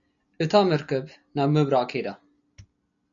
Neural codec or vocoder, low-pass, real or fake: none; 7.2 kHz; real